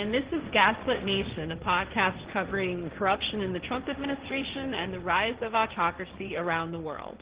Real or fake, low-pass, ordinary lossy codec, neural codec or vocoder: fake; 3.6 kHz; Opus, 16 kbps; codec, 16 kHz, 1.1 kbps, Voila-Tokenizer